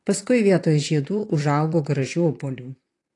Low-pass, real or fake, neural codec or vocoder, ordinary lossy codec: 10.8 kHz; fake; vocoder, 44.1 kHz, 128 mel bands, Pupu-Vocoder; AAC, 48 kbps